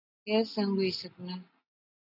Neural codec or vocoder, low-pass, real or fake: none; 5.4 kHz; real